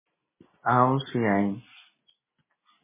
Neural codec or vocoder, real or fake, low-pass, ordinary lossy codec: none; real; 3.6 kHz; MP3, 16 kbps